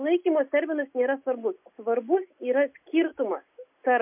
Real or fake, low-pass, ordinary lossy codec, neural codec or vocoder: real; 3.6 kHz; MP3, 32 kbps; none